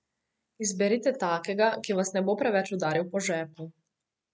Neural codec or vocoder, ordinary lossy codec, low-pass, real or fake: none; none; none; real